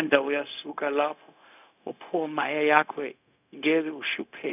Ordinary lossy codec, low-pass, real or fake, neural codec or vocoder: none; 3.6 kHz; fake; codec, 16 kHz, 0.4 kbps, LongCat-Audio-Codec